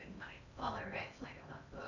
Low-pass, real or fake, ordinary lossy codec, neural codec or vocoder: 7.2 kHz; fake; none; codec, 16 kHz in and 24 kHz out, 0.6 kbps, FocalCodec, streaming, 4096 codes